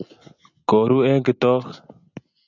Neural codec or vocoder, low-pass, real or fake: none; 7.2 kHz; real